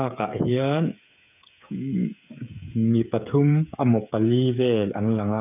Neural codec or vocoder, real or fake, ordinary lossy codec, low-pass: codec, 16 kHz, 8 kbps, FreqCodec, smaller model; fake; none; 3.6 kHz